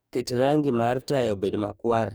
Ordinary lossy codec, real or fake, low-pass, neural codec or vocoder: none; fake; none; codec, 44.1 kHz, 2.6 kbps, DAC